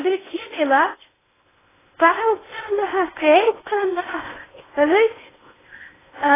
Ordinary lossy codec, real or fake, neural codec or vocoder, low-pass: AAC, 16 kbps; fake; codec, 16 kHz in and 24 kHz out, 0.6 kbps, FocalCodec, streaming, 4096 codes; 3.6 kHz